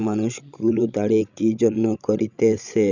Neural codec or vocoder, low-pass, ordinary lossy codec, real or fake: codec, 16 kHz, 16 kbps, FreqCodec, larger model; 7.2 kHz; none; fake